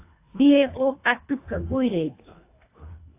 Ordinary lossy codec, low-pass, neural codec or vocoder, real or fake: AAC, 24 kbps; 3.6 kHz; codec, 24 kHz, 1.5 kbps, HILCodec; fake